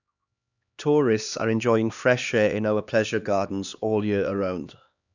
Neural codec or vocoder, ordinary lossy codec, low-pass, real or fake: codec, 16 kHz, 2 kbps, X-Codec, HuBERT features, trained on LibriSpeech; none; 7.2 kHz; fake